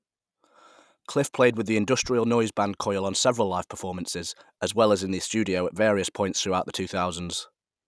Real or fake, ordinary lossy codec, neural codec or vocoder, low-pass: real; none; none; none